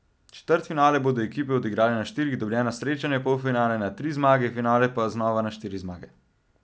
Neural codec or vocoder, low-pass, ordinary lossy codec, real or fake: none; none; none; real